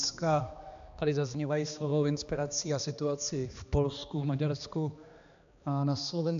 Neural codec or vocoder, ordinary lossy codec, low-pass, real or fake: codec, 16 kHz, 2 kbps, X-Codec, HuBERT features, trained on balanced general audio; AAC, 96 kbps; 7.2 kHz; fake